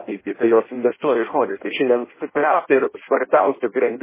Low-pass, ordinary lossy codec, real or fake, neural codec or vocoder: 3.6 kHz; MP3, 16 kbps; fake; codec, 16 kHz in and 24 kHz out, 0.6 kbps, FireRedTTS-2 codec